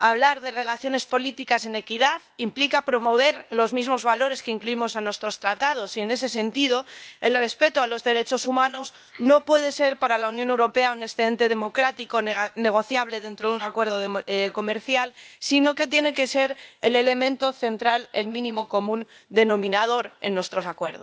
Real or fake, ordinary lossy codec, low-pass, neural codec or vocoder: fake; none; none; codec, 16 kHz, 0.8 kbps, ZipCodec